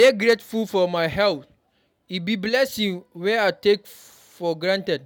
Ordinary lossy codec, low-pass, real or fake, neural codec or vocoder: none; 19.8 kHz; real; none